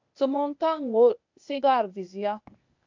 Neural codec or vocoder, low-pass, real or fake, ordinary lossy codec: codec, 16 kHz, 0.8 kbps, ZipCodec; 7.2 kHz; fake; MP3, 64 kbps